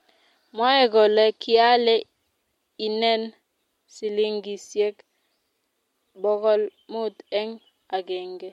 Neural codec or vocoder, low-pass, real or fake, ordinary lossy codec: none; 19.8 kHz; real; MP3, 64 kbps